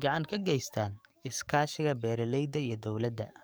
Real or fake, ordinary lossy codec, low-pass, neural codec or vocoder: fake; none; none; codec, 44.1 kHz, 7.8 kbps, DAC